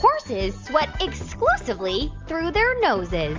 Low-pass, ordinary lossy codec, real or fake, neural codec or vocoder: 7.2 kHz; Opus, 32 kbps; real; none